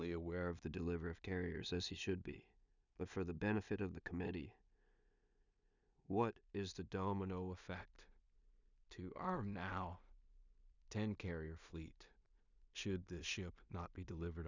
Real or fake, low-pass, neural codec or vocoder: fake; 7.2 kHz; codec, 16 kHz in and 24 kHz out, 0.4 kbps, LongCat-Audio-Codec, two codebook decoder